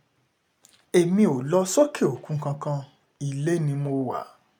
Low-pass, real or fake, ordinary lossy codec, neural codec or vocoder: 19.8 kHz; real; none; none